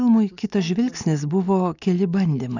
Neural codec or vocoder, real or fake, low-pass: none; real; 7.2 kHz